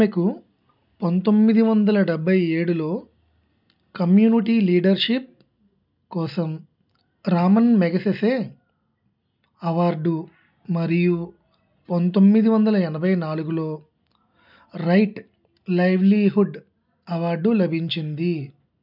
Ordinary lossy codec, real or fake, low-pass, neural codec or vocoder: none; real; 5.4 kHz; none